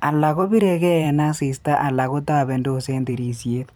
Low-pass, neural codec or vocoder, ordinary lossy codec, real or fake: none; vocoder, 44.1 kHz, 128 mel bands every 512 samples, BigVGAN v2; none; fake